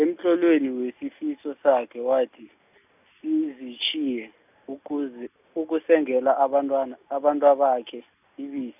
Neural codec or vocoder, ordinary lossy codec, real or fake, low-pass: none; none; real; 3.6 kHz